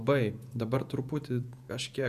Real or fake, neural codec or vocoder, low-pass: fake; vocoder, 48 kHz, 128 mel bands, Vocos; 14.4 kHz